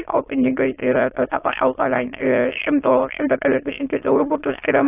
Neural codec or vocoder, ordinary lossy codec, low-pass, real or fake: autoencoder, 22.05 kHz, a latent of 192 numbers a frame, VITS, trained on many speakers; AAC, 24 kbps; 3.6 kHz; fake